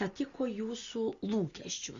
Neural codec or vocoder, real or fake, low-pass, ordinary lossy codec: none; real; 7.2 kHz; Opus, 64 kbps